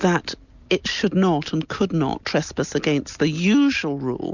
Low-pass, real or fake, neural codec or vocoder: 7.2 kHz; real; none